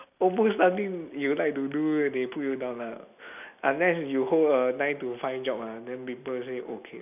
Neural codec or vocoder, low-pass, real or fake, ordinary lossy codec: none; 3.6 kHz; real; none